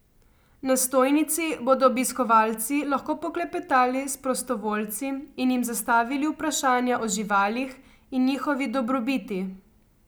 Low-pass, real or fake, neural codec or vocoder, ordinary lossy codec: none; real; none; none